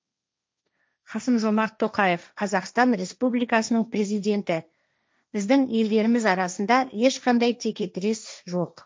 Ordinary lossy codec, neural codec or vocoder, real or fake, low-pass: none; codec, 16 kHz, 1.1 kbps, Voila-Tokenizer; fake; none